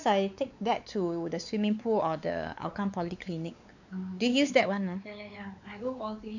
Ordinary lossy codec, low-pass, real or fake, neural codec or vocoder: none; 7.2 kHz; fake; codec, 16 kHz, 4 kbps, X-Codec, WavLM features, trained on Multilingual LibriSpeech